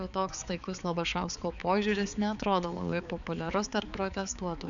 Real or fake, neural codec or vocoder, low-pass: fake; codec, 16 kHz, 4 kbps, X-Codec, HuBERT features, trained on balanced general audio; 7.2 kHz